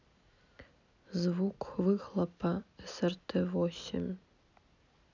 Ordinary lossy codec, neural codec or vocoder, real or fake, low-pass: none; none; real; 7.2 kHz